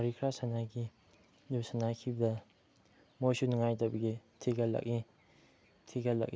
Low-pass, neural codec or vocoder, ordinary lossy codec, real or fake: none; none; none; real